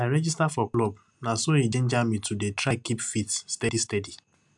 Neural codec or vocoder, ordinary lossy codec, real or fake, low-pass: none; MP3, 96 kbps; real; 10.8 kHz